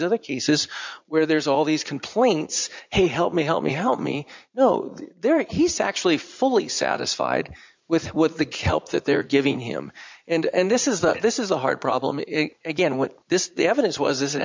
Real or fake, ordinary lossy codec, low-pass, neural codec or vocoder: fake; MP3, 64 kbps; 7.2 kHz; vocoder, 44.1 kHz, 80 mel bands, Vocos